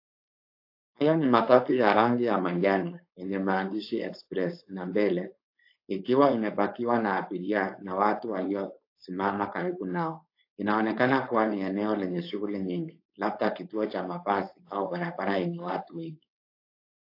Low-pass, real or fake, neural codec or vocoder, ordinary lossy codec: 5.4 kHz; fake; codec, 16 kHz, 4.8 kbps, FACodec; AAC, 32 kbps